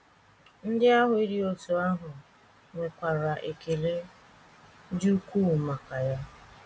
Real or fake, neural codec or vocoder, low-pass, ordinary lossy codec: real; none; none; none